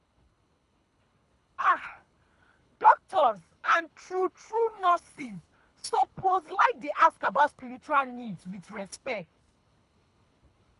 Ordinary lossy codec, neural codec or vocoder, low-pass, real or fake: none; codec, 24 kHz, 3 kbps, HILCodec; 10.8 kHz; fake